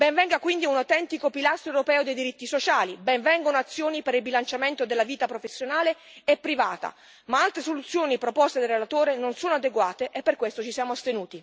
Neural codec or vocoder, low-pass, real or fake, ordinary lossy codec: none; none; real; none